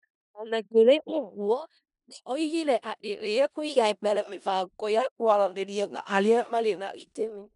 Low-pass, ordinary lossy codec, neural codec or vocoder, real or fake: 10.8 kHz; MP3, 96 kbps; codec, 16 kHz in and 24 kHz out, 0.4 kbps, LongCat-Audio-Codec, four codebook decoder; fake